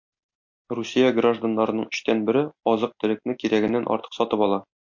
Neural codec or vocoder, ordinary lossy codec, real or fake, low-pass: none; MP3, 48 kbps; real; 7.2 kHz